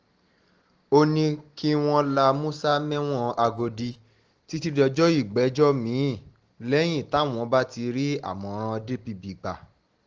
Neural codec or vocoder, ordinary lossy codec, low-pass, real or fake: none; Opus, 16 kbps; 7.2 kHz; real